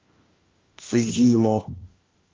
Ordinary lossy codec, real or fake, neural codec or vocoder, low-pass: Opus, 32 kbps; fake; codec, 16 kHz, 1 kbps, FunCodec, trained on LibriTTS, 50 frames a second; 7.2 kHz